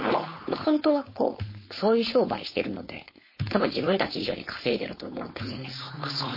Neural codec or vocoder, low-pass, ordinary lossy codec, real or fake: codec, 16 kHz, 4.8 kbps, FACodec; 5.4 kHz; MP3, 24 kbps; fake